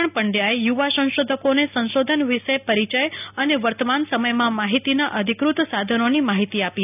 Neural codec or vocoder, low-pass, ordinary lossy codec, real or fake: none; 3.6 kHz; none; real